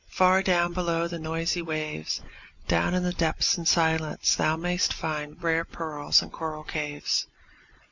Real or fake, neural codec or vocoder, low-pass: real; none; 7.2 kHz